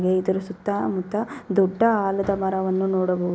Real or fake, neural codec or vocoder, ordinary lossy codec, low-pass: real; none; none; none